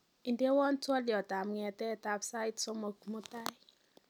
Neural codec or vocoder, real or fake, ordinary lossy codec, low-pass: none; real; none; 19.8 kHz